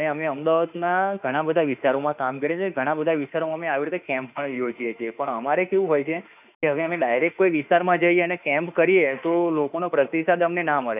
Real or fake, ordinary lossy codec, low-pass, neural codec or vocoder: fake; none; 3.6 kHz; autoencoder, 48 kHz, 32 numbers a frame, DAC-VAE, trained on Japanese speech